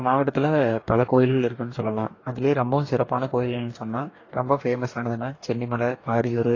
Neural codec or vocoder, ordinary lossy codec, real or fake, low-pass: codec, 44.1 kHz, 2.6 kbps, DAC; AAC, 32 kbps; fake; 7.2 kHz